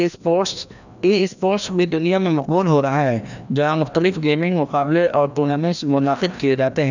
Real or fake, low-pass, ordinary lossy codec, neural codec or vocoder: fake; 7.2 kHz; none; codec, 16 kHz, 1 kbps, FreqCodec, larger model